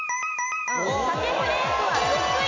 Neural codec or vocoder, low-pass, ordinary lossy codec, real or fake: none; 7.2 kHz; MP3, 64 kbps; real